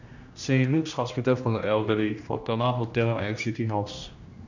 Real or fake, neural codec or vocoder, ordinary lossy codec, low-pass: fake; codec, 16 kHz, 1 kbps, X-Codec, HuBERT features, trained on general audio; none; 7.2 kHz